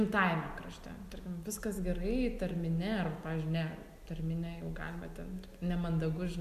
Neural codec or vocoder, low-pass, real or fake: none; 14.4 kHz; real